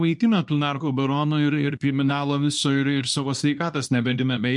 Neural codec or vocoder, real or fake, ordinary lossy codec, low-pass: codec, 24 kHz, 0.9 kbps, WavTokenizer, small release; fake; MP3, 64 kbps; 10.8 kHz